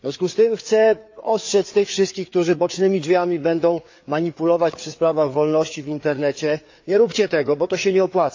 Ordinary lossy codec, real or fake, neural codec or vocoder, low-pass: AAC, 48 kbps; fake; codec, 16 kHz, 4 kbps, FreqCodec, larger model; 7.2 kHz